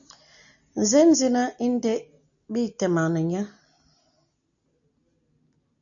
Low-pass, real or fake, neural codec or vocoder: 7.2 kHz; real; none